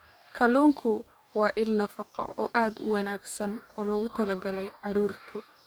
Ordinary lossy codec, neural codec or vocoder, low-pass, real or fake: none; codec, 44.1 kHz, 2.6 kbps, DAC; none; fake